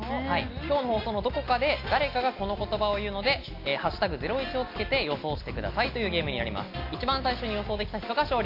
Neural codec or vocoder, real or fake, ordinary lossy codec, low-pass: none; real; AAC, 32 kbps; 5.4 kHz